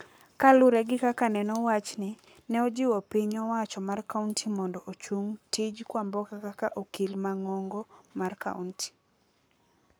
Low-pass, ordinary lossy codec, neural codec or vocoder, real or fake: none; none; codec, 44.1 kHz, 7.8 kbps, Pupu-Codec; fake